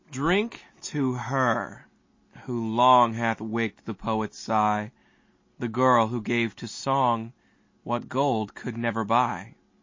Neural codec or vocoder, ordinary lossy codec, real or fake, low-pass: none; MP3, 32 kbps; real; 7.2 kHz